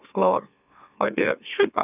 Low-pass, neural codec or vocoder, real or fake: 3.6 kHz; autoencoder, 44.1 kHz, a latent of 192 numbers a frame, MeloTTS; fake